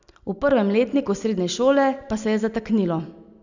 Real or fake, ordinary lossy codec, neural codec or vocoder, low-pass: real; none; none; 7.2 kHz